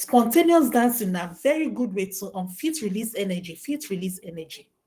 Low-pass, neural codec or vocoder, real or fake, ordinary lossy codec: 14.4 kHz; codec, 44.1 kHz, 7.8 kbps, Pupu-Codec; fake; Opus, 24 kbps